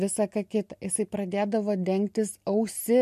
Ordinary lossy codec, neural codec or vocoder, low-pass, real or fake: MP3, 64 kbps; none; 14.4 kHz; real